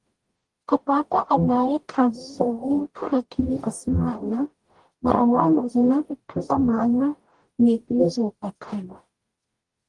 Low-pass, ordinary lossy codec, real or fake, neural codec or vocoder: 10.8 kHz; Opus, 24 kbps; fake; codec, 44.1 kHz, 0.9 kbps, DAC